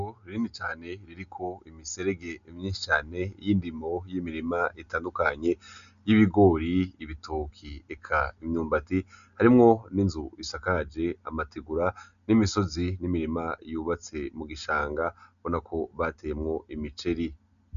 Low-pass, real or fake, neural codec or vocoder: 7.2 kHz; real; none